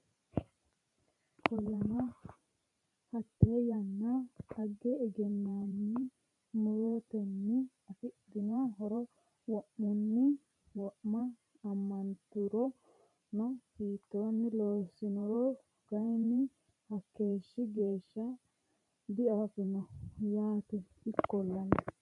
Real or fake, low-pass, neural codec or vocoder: fake; 10.8 kHz; vocoder, 44.1 kHz, 128 mel bands every 512 samples, BigVGAN v2